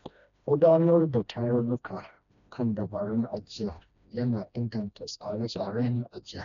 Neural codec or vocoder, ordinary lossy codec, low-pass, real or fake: codec, 16 kHz, 1 kbps, FreqCodec, smaller model; none; 7.2 kHz; fake